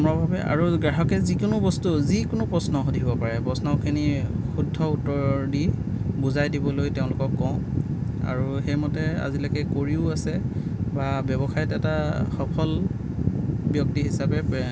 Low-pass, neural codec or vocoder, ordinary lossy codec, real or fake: none; none; none; real